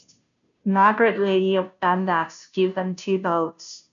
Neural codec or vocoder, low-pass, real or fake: codec, 16 kHz, 0.5 kbps, FunCodec, trained on Chinese and English, 25 frames a second; 7.2 kHz; fake